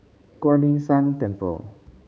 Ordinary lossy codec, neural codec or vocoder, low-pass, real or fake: none; codec, 16 kHz, 4 kbps, X-Codec, HuBERT features, trained on balanced general audio; none; fake